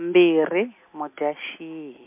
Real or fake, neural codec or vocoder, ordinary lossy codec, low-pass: real; none; MP3, 32 kbps; 3.6 kHz